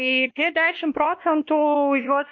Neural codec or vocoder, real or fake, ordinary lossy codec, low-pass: codec, 16 kHz, 1 kbps, X-Codec, WavLM features, trained on Multilingual LibriSpeech; fake; AAC, 48 kbps; 7.2 kHz